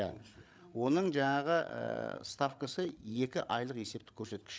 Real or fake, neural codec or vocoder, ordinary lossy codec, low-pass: fake; codec, 16 kHz, 8 kbps, FreqCodec, larger model; none; none